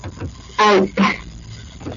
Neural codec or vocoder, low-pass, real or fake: none; 7.2 kHz; real